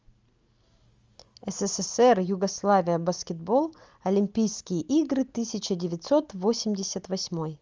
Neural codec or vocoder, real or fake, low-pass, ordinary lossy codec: none; real; 7.2 kHz; Opus, 32 kbps